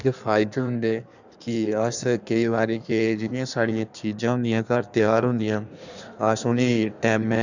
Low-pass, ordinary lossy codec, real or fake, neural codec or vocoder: 7.2 kHz; none; fake; codec, 16 kHz in and 24 kHz out, 1.1 kbps, FireRedTTS-2 codec